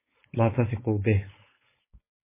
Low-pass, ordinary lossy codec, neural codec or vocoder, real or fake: 3.6 kHz; MP3, 16 kbps; codec, 16 kHz, 4.8 kbps, FACodec; fake